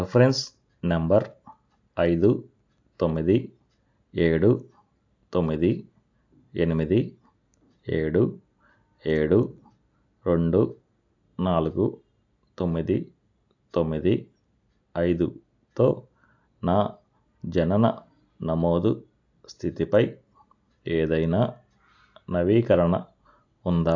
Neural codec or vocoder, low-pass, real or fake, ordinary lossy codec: none; 7.2 kHz; real; AAC, 48 kbps